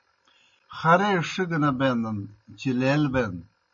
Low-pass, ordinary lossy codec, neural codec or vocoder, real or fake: 7.2 kHz; MP3, 32 kbps; none; real